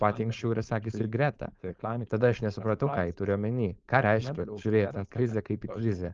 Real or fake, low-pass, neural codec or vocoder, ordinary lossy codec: fake; 7.2 kHz; codec, 16 kHz, 4.8 kbps, FACodec; Opus, 24 kbps